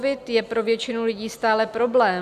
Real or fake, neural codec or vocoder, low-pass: real; none; 14.4 kHz